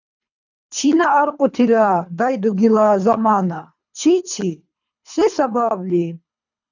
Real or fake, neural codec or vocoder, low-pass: fake; codec, 24 kHz, 3 kbps, HILCodec; 7.2 kHz